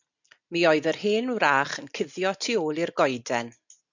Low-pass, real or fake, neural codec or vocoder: 7.2 kHz; real; none